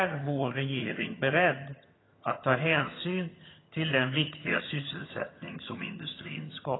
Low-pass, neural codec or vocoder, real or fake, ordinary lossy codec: 7.2 kHz; vocoder, 22.05 kHz, 80 mel bands, HiFi-GAN; fake; AAC, 16 kbps